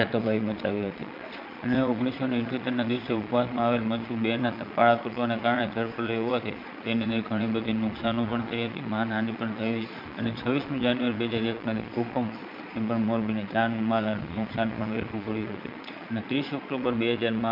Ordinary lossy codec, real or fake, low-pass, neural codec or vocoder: MP3, 48 kbps; fake; 5.4 kHz; vocoder, 22.05 kHz, 80 mel bands, Vocos